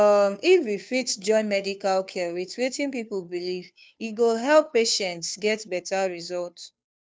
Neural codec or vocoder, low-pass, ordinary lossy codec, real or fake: codec, 16 kHz, 2 kbps, FunCodec, trained on Chinese and English, 25 frames a second; none; none; fake